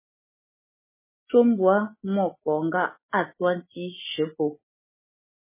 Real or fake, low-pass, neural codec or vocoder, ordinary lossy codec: real; 3.6 kHz; none; MP3, 16 kbps